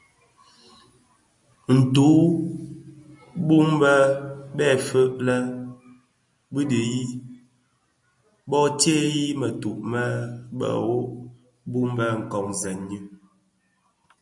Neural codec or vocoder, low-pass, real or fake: none; 10.8 kHz; real